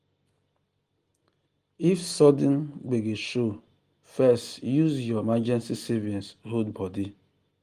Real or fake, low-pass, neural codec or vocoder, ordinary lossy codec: real; 14.4 kHz; none; Opus, 24 kbps